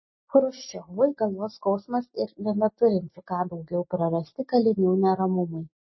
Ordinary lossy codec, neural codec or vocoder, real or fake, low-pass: MP3, 24 kbps; none; real; 7.2 kHz